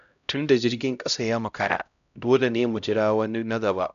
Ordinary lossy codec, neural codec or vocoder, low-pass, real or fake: none; codec, 16 kHz, 0.5 kbps, X-Codec, HuBERT features, trained on LibriSpeech; 7.2 kHz; fake